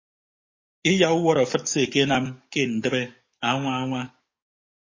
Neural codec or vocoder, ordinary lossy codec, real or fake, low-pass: codec, 44.1 kHz, 7.8 kbps, DAC; MP3, 32 kbps; fake; 7.2 kHz